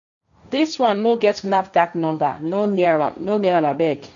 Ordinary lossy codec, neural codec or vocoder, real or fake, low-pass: none; codec, 16 kHz, 1.1 kbps, Voila-Tokenizer; fake; 7.2 kHz